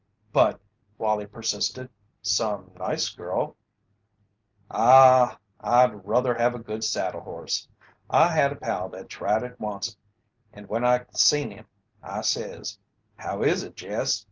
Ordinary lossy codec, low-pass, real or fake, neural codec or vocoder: Opus, 24 kbps; 7.2 kHz; real; none